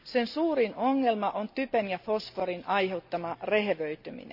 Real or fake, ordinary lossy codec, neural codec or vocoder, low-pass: real; none; none; 5.4 kHz